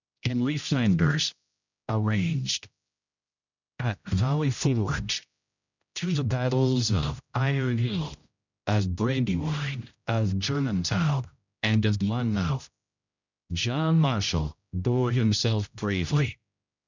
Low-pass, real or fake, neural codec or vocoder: 7.2 kHz; fake; codec, 16 kHz, 0.5 kbps, X-Codec, HuBERT features, trained on general audio